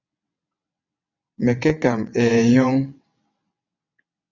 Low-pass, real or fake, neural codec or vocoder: 7.2 kHz; fake; vocoder, 22.05 kHz, 80 mel bands, WaveNeXt